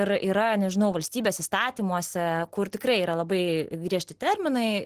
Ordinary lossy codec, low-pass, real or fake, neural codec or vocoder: Opus, 16 kbps; 14.4 kHz; real; none